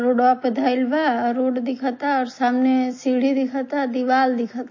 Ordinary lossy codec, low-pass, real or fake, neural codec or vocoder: MP3, 32 kbps; 7.2 kHz; real; none